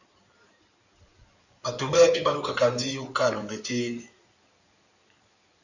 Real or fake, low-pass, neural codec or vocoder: fake; 7.2 kHz; codec, 16 kHz in and 24 kHz out, 2.2 kbps, FireRedTTS-2 codec